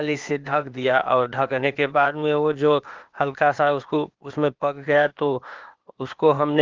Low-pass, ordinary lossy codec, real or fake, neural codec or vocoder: 7.2 kHz; Opus, 32 kbps; fake; codec, 16 kHz, 0.8 kbps, ZipCodec